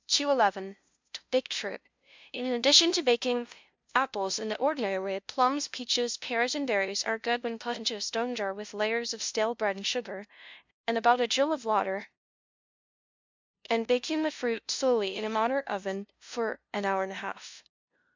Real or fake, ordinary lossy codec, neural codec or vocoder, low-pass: fake; MP3, 64 kbps; codec, 16 kHz, 0.5 kbps, FunCodec, trained on LibriTTS, 25 frames a second; 7.2 kHz